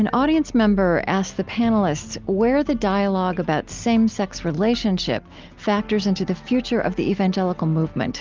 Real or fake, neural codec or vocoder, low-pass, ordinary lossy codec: real; none; 7.2 kHz; Opus, 24 kbps